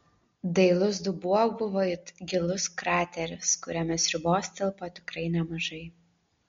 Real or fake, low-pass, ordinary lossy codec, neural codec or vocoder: real; 7.2 kHz; MP3, 48 kbps; none